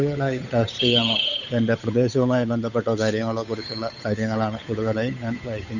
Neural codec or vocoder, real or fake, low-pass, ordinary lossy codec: codec, 16 kHz, 8 kbps, FunCodec, trained on Chinese and English, 25 frames a second; fake; 7.2 kHz; none